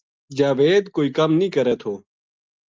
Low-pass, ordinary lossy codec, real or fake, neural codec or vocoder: 7.2 kHz; Opus, 24 kbps; real; none